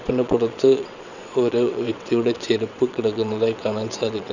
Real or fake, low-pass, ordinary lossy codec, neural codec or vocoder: fake; 7.2 kHz; none; vocoder, 22.05 kHz, 80 mel bands, WaveNeXt